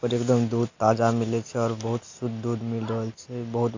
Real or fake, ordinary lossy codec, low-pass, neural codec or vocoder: real; AAC, 48 kbps; 7.2 kHz; none